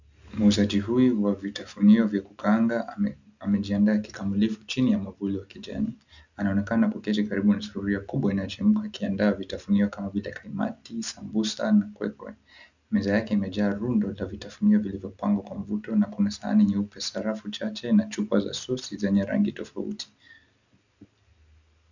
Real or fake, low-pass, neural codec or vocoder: real; 7.2 kHz; none